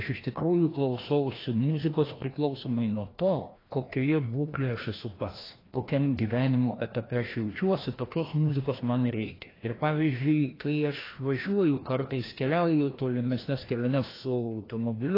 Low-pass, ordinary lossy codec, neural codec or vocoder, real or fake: 5.4 kHz; AAC, 24 kbps; codec, 16 kHz, 1 kbps, FreqCodec, larger model; fake